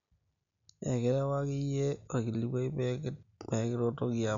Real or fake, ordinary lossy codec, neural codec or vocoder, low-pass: real; none; none; 7.2 kHz